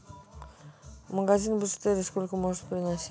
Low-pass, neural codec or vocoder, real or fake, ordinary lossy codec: none; none; real; none